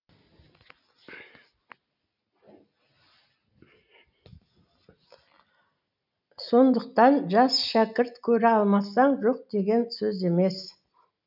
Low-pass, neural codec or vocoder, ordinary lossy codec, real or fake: 5.4 kHz; none; none; real